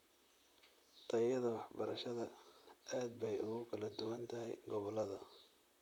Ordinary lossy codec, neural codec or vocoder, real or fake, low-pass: none; vocoder, 44.1 kHz, 128 mel bands, Pupu-Vocoder; fake; 19.8 kHz